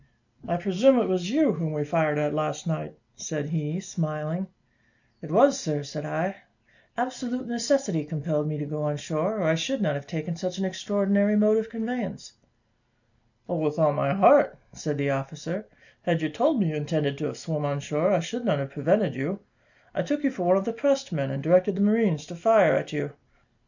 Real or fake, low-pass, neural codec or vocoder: real; 7.2 kHz; none